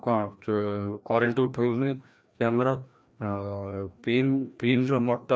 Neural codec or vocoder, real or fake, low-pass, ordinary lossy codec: codec, 16 kHz, 1 kbps, FreqCodec, larger model; fake; none; none